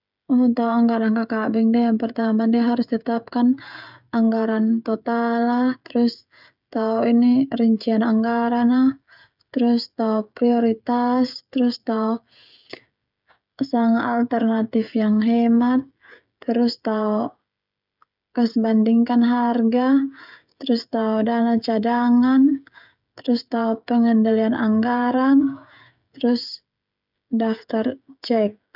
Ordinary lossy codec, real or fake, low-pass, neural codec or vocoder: none; fake; 5.4 kHz; codec, 16 kHz, 16 kbps, FreqCodec, smaller model